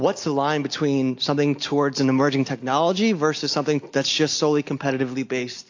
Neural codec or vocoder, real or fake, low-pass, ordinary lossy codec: none; real; 7.2 kHz; AAC, 48 kbps